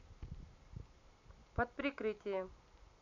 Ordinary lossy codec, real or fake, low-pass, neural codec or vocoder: none; real; 7.2 kHz; none